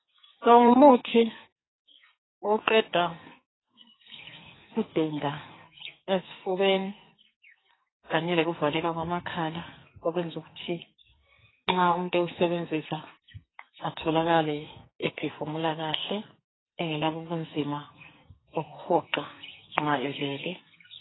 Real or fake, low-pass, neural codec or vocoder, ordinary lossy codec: fake; 7.2 kHz; codec, 44.1 kHz, 2.6 kbps, SNAC; AAC, 16 kbps